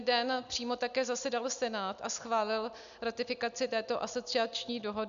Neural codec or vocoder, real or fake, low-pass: none; real; 7.2 kHz